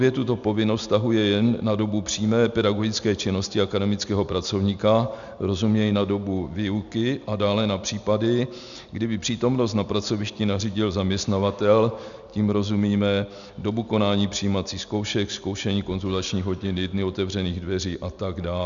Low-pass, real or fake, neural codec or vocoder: 7.2 kHz; real; none